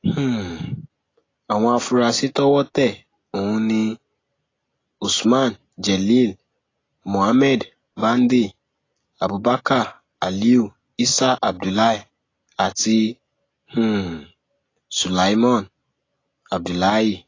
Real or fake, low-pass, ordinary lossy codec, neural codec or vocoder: real; 7.2 kHz; AAC, 32 kbps; none